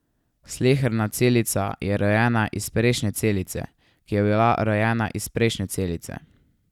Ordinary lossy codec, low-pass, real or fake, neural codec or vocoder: none; 19.8 kHz; real; none